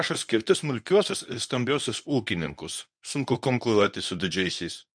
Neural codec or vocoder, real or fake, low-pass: codec, 24 kHz, 0.9 kbps, WavTokenizer, medium speech release version 1; fake; 9.9 kHz